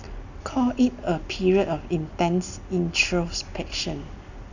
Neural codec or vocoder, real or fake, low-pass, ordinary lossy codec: none; real; 7.2 kHz; none